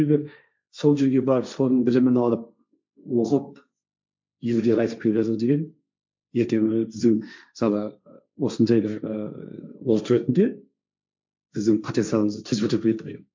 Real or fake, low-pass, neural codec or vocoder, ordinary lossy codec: fake; 7.2 kHz; codec, 16 kHz, 1.1 kbps, Voila-Tokenizer; MP3, 64 kbps